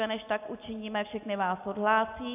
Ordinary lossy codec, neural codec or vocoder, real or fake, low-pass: AAC, 32 kbps; none; real; 3.6 kHz